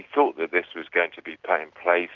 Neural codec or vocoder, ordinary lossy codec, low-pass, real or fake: none; Opus, 64 kbps; 7.2 kHz; real